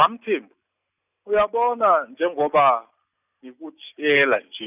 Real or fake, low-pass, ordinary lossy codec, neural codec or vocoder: real; 3.6 kHz; none; none